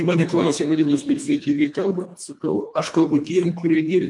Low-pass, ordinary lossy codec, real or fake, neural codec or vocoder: 10.8 kHz; MP3, 64 kbps; fake; codec, 24 kHz, 1.5 kbps, HILCodec